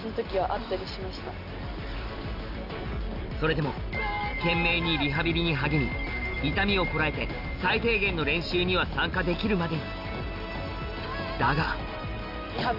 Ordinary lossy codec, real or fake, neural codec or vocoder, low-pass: none; real; none; 5.4 kHz